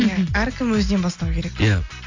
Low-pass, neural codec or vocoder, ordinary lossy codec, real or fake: 7.2 kHz; none; AAC, 48 kbps; real